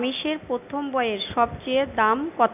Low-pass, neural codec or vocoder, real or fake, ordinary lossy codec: 3.6 kHz; none; real; none